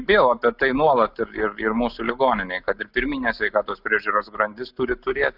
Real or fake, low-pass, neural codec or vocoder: real; 5.4 kHz; none